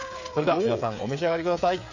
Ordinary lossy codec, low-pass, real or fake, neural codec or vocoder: Opus, 64 kbps; 7.2 kHz; fake; codec, 16 kHz, 8 kbps, FreqCodec, smaller model